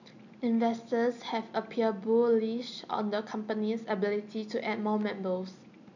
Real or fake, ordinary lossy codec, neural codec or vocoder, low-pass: real; none; none; 7.2 kHz